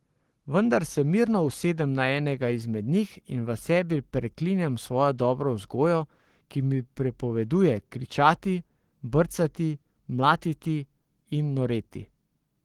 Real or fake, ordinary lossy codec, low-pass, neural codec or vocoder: fake; Opus, 16 kbps; 19.8 kHz; codec, 44.1 kHz, 7.8 kbps, Pupu-Codec